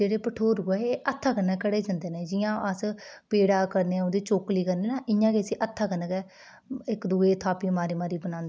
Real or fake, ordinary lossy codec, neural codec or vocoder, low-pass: real; none; none; none